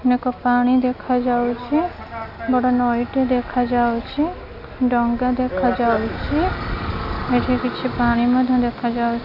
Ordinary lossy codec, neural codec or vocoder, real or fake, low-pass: none; none; real; 5.4 kHz